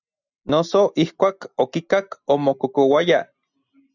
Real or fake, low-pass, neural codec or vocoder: real; 7.2 kHz; none